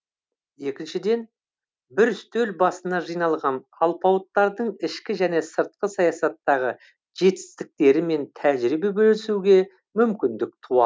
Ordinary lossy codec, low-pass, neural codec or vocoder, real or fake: none; none; none; real